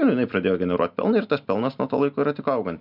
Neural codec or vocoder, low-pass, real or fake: none; 5.4 kHz; real